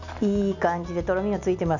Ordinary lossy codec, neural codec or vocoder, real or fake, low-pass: none; none; real; 7.2 kHz